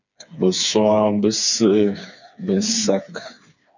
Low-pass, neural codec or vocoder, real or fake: 7.2 kHz; codec, 16 kHz, 4 kbps, FreqCodec, smaller model; fake